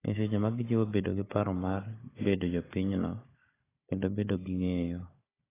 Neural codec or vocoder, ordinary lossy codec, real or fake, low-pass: codec, 16 kHz, 16 kbps, FunCodec, trained on Chinese and English, 50 frames a second; AAC, 16 kbps; fake; 3.6 kHz